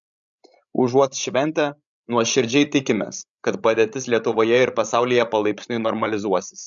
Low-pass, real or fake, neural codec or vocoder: 7.2 kHz; fake; codec, 16 kHz, 16 kbps, FreqCodec, larger model